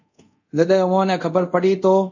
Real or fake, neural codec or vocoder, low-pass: fake; codec, 24 kHz, 0.5 kbps, DualCodec; 7.2 kHz